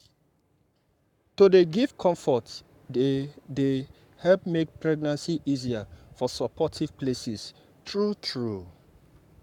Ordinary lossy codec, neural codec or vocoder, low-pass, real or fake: none; codec, 44.1 kHz, 7.8 kbps, Pupu-Codec; 19.8 kHz; fake